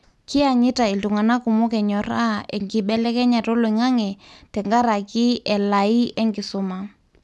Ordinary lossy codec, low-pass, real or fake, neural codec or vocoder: none; none; real; none